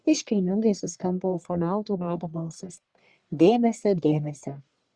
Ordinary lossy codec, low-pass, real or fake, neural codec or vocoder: Opus, 64 kbps; 9.9 kHz; fake; codec, 44.1 kHz, 1.7 kbps, Pupu-Codec